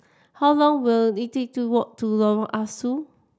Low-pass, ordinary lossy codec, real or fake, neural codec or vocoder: none; none; real; none